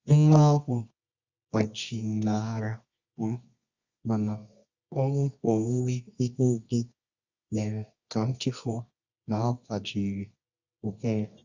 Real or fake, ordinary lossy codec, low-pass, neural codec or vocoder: fake; Opus, 64 kbps; 7.2 kHz; codec, 24 kHz, 0.9 kbps, WavTokenizer, medium music audio release